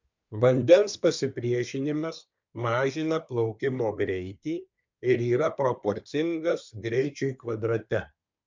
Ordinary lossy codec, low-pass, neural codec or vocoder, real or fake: MP3, 64 kbps; 7.2 kHz; codec, 24 kHz, 1 kbps, SNAC; fake